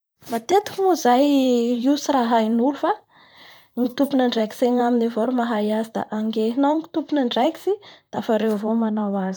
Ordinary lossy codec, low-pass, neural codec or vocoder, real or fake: none; none; vocoder, 44.1 kHz, 128 mel bands, Pupu-Vocoder; fake